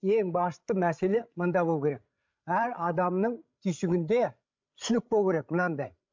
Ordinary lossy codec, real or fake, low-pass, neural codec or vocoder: MP3, 64 kbps; fake; 7.2 kHz; codec, 16 kHz, 16 kbps, FreqCodec, larger model